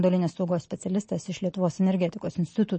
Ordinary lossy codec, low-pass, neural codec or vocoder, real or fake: MP3, 32 kbps; 10.8 kHz; none; real